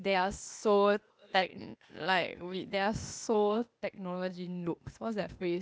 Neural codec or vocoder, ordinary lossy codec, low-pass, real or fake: codec, 16 kHz, 0.8 kbps, ZipCodec; none; none; fake